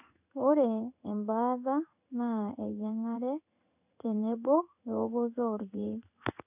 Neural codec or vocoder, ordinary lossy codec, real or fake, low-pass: codec, 16 kHz in and 24 kHz out, 1 kbps, XY-Tokenizer; none; fake; 3.6 kHz